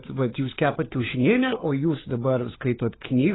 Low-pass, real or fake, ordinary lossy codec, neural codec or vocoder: 7.2 kHz; fake; AAC, 16 kbps; codec, 16 kHz, 4 kbps, FunCodec, trained on LibriTTS, 50 frames a second